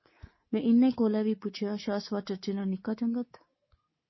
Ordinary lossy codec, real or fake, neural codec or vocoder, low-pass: MP3, 24 kbps; fake; codec, 24 kHz, 3.1 kbps, DualCodec; 7.2 kHz